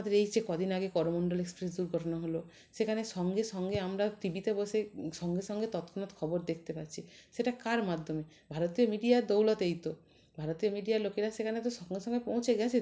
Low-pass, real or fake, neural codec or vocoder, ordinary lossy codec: none; real; none; none